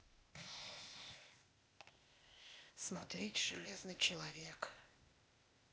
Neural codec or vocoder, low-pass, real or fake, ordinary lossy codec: codec, 16 kHz, 0.8 kbps, ZipCodec; none; fake; none